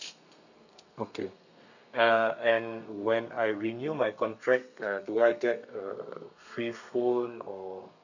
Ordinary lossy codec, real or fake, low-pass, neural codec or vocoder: none; fake; 7.2 kHz; codec, 32 kHz, 1.9 kbps, SNAC